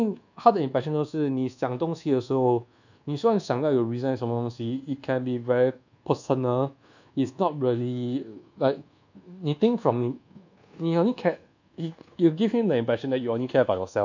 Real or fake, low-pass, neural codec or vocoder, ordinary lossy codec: fake; 7.2 kHz; codec, 24 kHz, 1.2 kbps, DualCodec; none